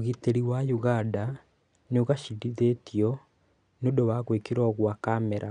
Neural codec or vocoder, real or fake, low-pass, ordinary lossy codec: none; real; 9.9 kHz; none